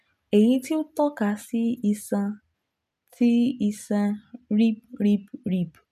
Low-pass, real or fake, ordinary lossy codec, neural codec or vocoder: 14.4 kHz; real; none; none